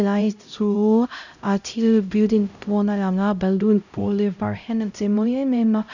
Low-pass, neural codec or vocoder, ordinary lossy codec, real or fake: 7.2 kHz; codec, 16 kHz, 0.5 kbps, X-Codec, HuBERT features, trained on LibriSpeech; none; fake